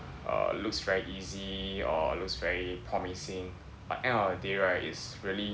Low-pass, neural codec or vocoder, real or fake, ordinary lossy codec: none; none; real; none